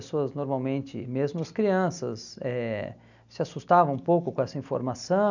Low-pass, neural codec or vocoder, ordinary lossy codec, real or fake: 7.2 kHz; none; none; real